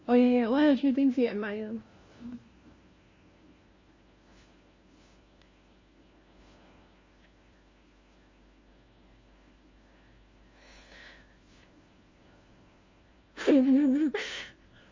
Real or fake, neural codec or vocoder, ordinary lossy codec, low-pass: fake; codec, 16 kHz, 1 kbps, FunCodec, trained on LibriTTS, 50 frames a second; MP3, 32 kbps; 7.2 kHz